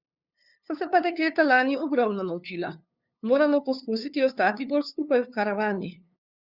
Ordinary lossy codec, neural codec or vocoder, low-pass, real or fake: Opus, 64 kbps; codec, 16 kHz, 2 kbps, FunCodec, trained on LibriTTS, 25 frames a second; 5.4 kHz; fake